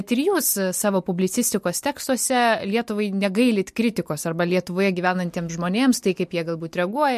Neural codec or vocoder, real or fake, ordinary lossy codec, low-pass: none; real; MP3, 64 kbps; 14.4 kHz